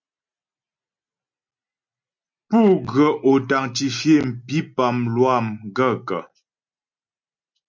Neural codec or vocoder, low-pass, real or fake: none; 7.2 kHz; real